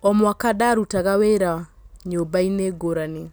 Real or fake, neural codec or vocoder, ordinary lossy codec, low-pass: real; none; none; none